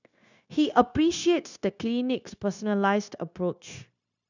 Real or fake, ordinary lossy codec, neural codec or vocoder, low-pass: fake; none; codec, 16 kHz, 0.9 kbps, LongCat-Audio-Codec; 7.2 kHz